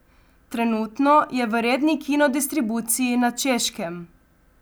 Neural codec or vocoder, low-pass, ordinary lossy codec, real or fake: none; none; none; real